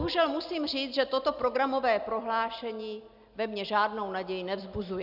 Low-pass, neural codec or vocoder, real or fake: 5.4 kHz; none; real